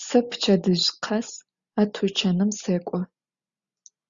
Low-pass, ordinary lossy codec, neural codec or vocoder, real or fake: 7.2 kHz; Opus, 64 kbps; none; real